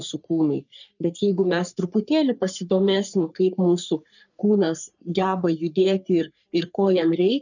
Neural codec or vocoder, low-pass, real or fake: codec, 44.1 kHz, 3.4 kbps, Pupu-Codec; 7.2 kHz; fake